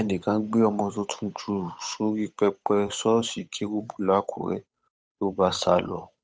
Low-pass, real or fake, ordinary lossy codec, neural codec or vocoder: none; fake; none; codec, 16 kHz, 8 kbps, FunCodec, trained on Chinese and English, 25 frames a second